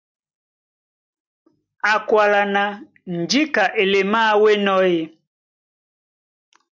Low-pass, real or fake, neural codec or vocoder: 7.2 kHz; real; none